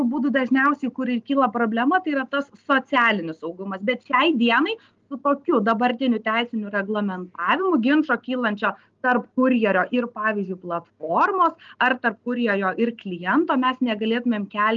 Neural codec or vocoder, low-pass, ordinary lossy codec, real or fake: none; 7.2 kHz; Opus, 32 kbps; real